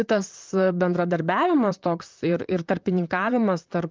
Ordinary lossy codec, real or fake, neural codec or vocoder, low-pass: Opus, 24 kbps; fake; vocoder, 44.1 kHz, 128 mel bands, Pupu-Vocoder; 7.2 kHz